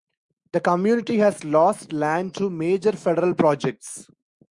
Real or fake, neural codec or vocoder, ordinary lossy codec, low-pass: fake; autoencoder, 48 kHz, 128 numbers a frame, DAC-VAE, trained on Japanese speech; Opus, 64 kbps; 10.8 kHz